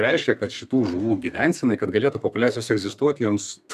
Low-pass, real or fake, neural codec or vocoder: 14.4 kHz; fake; codec, 32 kHz, 1.9 kbps, SNAC